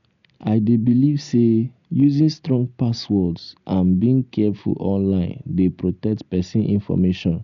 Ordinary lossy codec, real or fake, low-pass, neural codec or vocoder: none; real; 7.2 kHz; none